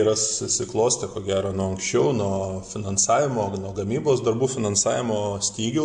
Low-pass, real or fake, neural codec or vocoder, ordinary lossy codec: 9.9 kHz; real; none; MP3, 64 kbps